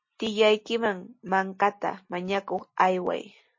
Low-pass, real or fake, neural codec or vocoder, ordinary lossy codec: 7.2 kHz; real; none; MP3, 32 kbps